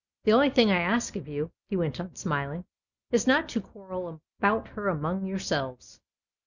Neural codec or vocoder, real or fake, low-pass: none; real; 7.2 kHz